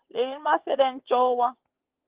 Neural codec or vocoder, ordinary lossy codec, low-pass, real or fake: vocoder, 22.05 kHz, 80 mel bands, WaveNeXt; Opus, 16 kbps; 3.6 kHz; fake